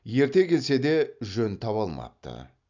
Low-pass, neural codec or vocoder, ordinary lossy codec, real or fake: 7.2 kHz; none; none; real